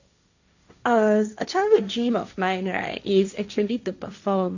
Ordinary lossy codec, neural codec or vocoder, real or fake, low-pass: none; codec, 16 kHz, 1.1 kbps, Voila-Tokenizer; fake; 7.2 kHz